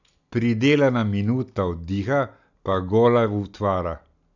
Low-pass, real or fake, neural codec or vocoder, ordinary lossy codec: 7.2 kHz; real; none; none